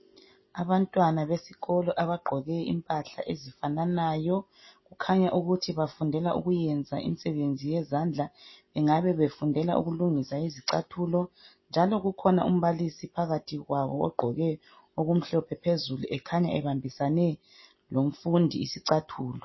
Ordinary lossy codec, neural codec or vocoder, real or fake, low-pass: MP3, 24 kbps; none; real; 7.2 kHz